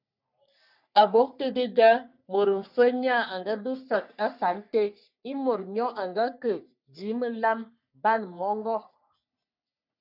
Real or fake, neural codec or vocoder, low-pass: fake; codec, 44.1 kHz, 3.4 kbps, Pupu-Codec; 5.4 kHz